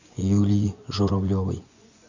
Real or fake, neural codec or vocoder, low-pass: fake; vocoder, 24 kHz, 100 mel bands, Vocos; 7.2 kHz